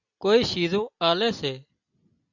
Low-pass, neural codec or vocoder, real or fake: 7.2 kHz; none; real